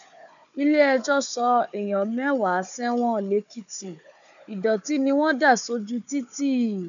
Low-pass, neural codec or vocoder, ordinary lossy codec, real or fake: 7.2 kHz; codec, 16 kHz, 4 kbps, FunCodec, trained on Chinese and English, 50 frames a second; none; fake